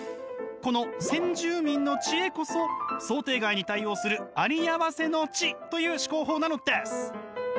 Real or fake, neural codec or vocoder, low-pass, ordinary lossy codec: real; none; none; none